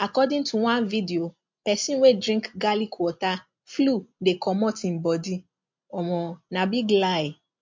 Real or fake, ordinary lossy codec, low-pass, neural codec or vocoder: real; MP3, 48 kbps; 7.2 kHz; none